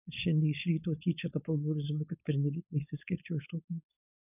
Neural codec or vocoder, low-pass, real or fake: codec, 16 kHz, 4.8 kbps, FACodec; 3.6 kHz; fake